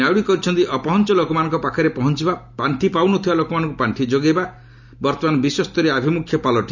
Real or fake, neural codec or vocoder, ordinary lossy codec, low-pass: real; none; none; 7.2 kHz